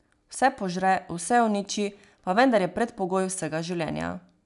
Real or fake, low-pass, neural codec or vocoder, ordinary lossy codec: real; 10.8 kHz; none; none